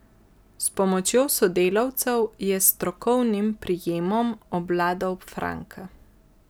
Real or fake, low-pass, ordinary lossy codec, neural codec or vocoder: real; none; none; none